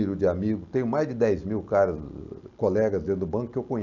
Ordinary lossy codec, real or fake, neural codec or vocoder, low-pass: none; real; none; 7.2 kHz